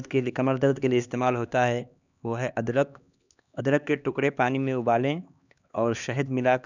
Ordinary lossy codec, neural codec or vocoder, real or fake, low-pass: none; codec, 16 kHz, 2 kbps, X-Codec, HuBERT features, trained on LibriSpeech; fake; 7.2 kHz